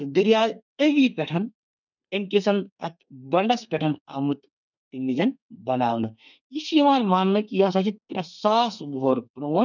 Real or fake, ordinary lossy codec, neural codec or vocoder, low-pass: fake; none; codec, 32 kHz, 1.9 kbps, SNAC; 7.2 kHz